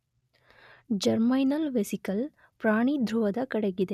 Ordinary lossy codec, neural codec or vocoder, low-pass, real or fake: none; none; 14.4 kHz; real